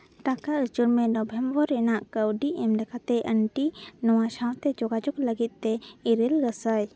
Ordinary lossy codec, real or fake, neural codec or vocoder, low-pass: none; real; none; none